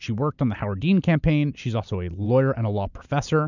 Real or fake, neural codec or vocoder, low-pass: real; none; 7.2 kHz